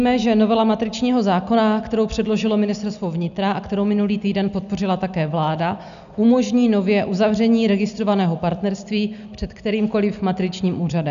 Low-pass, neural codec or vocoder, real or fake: 7.2 kHz; none; real